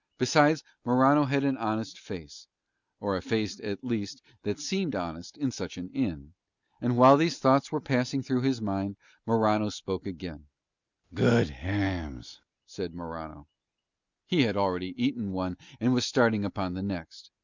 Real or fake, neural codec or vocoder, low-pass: real; none; 7.2 kHz